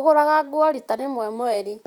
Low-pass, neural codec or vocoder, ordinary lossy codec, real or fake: 19.8 kHz; vocoder, 44.1 kHz, 128 mel bands, Pupu-Vocoder; Opus, 64 kbps; fake